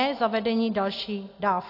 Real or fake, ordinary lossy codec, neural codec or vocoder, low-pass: real; AAC, 32 kbps; none; 5.4 kHz